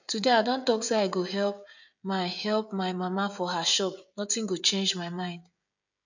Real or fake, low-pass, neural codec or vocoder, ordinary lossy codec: fake; 7.2 kHz; codec, 16 kHz, 16 kbps, FreqCodec, smaller model; none